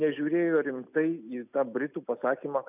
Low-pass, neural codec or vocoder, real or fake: 3.6 kHz; none; real